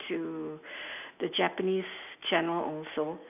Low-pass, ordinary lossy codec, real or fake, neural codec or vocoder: 3.6 kHz; none; real; none